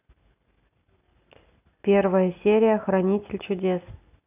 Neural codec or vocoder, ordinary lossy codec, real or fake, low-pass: none; none; real; 3.6 kHz